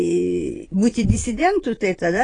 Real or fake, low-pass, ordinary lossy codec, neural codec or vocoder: fake; 9.9 kHz; AAC, 32 kbps; vocoder, 22.05 kHz, 80 mel bands, Vocos